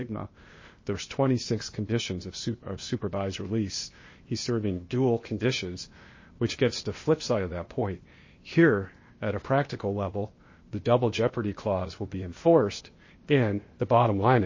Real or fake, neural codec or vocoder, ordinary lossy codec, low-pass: fake; codec, 16 kHz, 0.8 kbps, ZipCodec; MP3, 32 kbps; 7.2 kHz